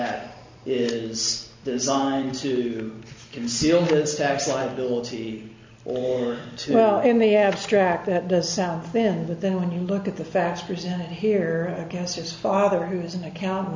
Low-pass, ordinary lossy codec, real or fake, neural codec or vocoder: 7.2 kHz; MP3, 64 kbps; real; none